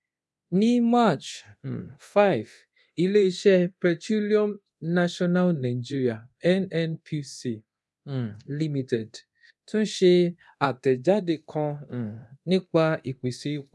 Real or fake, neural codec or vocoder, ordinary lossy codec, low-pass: fake; codec, 24 kHz, 0.9 kbps, DualCodec; none; none